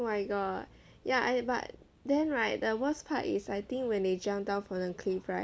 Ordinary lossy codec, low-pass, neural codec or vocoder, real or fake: none; none; none; real